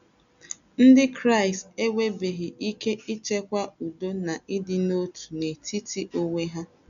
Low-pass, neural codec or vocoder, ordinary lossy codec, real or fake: 7.2 kHz; none; none; real